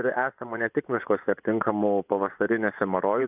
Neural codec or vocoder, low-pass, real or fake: vocoder, 24 kHz, 100 mel bands, Vocos; 3.6 kHz; fake